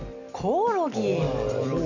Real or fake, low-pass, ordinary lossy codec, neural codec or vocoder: real; 7.2 kHz; none; none